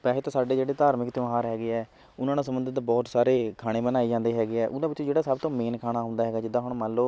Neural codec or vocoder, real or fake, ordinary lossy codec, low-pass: none; real; none; none